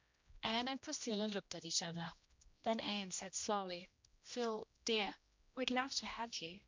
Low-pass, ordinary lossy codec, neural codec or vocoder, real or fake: 7.2 kHz; MP3, 64 kbps; codec, 16 kHz, 1 kbps, X-Codec, HuBERT features, trained on general audio; fake